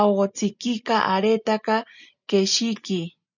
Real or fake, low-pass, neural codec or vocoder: real; 7.2 kHz; none